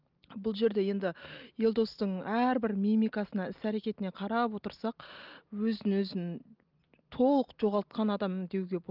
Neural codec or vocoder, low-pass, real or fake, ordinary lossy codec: none; 5.4 kHz; real; Opus, 24 kbps